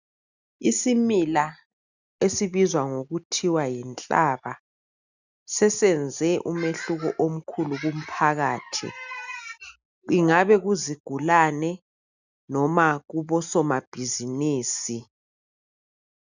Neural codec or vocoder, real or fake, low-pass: none; real; 7.2 kHz